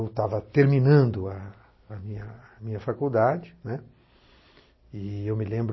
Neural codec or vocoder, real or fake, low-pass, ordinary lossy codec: none; real; 7.2 kHz; MP3, 24 kbps